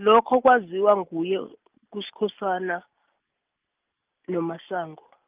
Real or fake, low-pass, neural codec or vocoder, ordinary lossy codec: real; 3.6 kHz; none; Opus, 24 kbps